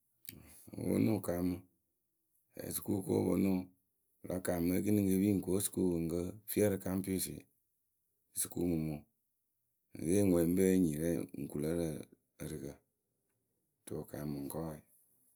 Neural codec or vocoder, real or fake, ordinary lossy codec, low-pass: none; real; none; none